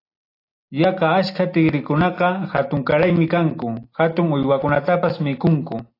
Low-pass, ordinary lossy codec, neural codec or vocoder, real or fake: 5.4 kHz; AAC, 32 kbps; none; real